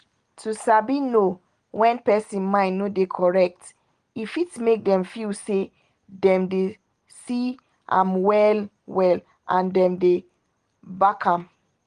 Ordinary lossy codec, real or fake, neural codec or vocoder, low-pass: Opus, 24 kbps; real; none; 9.9 kHz